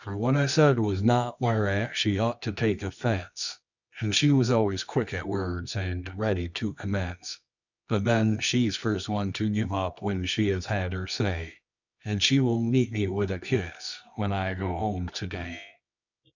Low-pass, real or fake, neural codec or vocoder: 7.2 kHz; fake; codec, 24 kHz, 0.9 kbps, WavTokenizer, medium music audio release